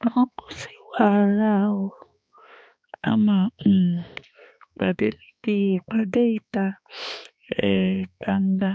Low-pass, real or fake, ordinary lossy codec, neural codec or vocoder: none; fake; none; codec, 16 kHz, 2 kbps, X-Codec, HuBERT features, trained on balanced general audio